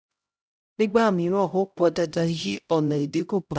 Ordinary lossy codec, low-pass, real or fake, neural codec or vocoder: none; none; fake; codec, 16 kHz, 0.5 kbps, X-Codec, HuBERT features, trained on LibriSpeech